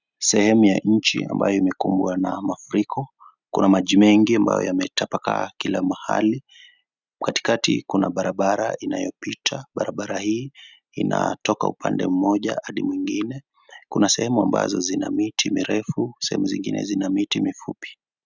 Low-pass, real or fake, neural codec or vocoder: 7.2 kHz; real; none